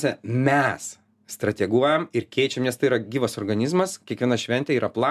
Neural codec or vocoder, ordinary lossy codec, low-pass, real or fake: none; MP3, 96 kbps; 14.4 kHz; real